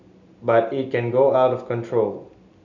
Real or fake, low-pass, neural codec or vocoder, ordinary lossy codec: real; 7.2 kHz; none; none